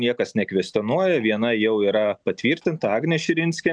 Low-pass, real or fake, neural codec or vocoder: 9.9 kHz; real; none